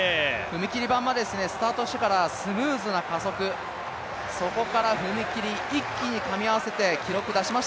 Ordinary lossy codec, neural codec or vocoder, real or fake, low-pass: none; none; real; none